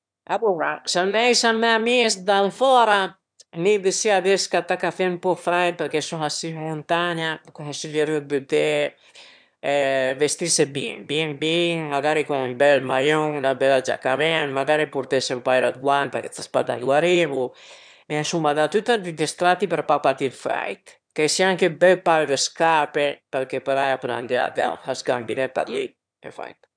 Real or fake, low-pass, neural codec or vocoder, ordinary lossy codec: fake; 9.9 kHz; autoencoder, 22.05 kHz, a latent of 192 numbers a frame, VITS, trained on one speaker; none